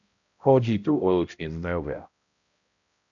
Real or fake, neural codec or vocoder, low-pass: fake; codec, 16 kHz, 0.5 kbps, X-Codec, HuBERT features, trained on balanced general audio; 7.2 kHz